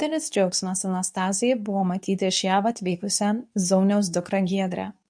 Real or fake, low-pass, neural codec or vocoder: fake; 9.9 kHz; codec, 24 kHz, 0.9 kbps, WavTokenizer, medium speech release version 2